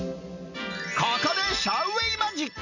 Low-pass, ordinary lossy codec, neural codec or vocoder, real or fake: 7.2 kHz; none; none; real